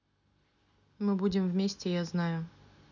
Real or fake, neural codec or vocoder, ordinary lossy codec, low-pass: real; none; none; 7.2 kHz